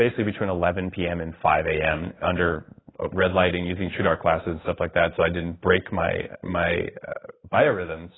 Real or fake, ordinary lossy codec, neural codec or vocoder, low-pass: fake; AAC, 16 kbps; codec, 16 kHz in and 24 kHz out, 1 kbps, XY-Tokenizer; 7.2 kHz